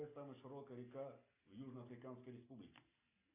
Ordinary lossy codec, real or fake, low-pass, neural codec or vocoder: AAC, 32 kbps; real; 3.6 kHz; none